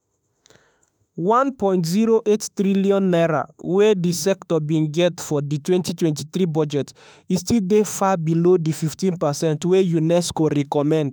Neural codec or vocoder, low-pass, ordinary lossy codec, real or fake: autoencoder, 48 kHz, 32 numbers a frame, DAC-VAE, trained on Japanese speech; none; none; fake